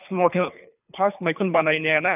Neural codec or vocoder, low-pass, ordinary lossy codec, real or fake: codec, 24 kHz, 3 kbps, HILCodec; 3.6 kHz; none; fake